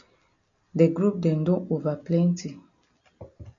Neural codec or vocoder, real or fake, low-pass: none; real; 7.2 kHz